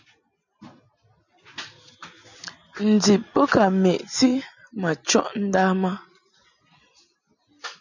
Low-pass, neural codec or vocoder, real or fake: 7.2 kHz; none; real